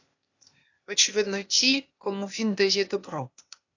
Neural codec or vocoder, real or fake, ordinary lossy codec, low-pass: codec, 16 kHz, 0.8 kbps, ZipCodec; fake; AAC, 48 kbps; 7.2 kHz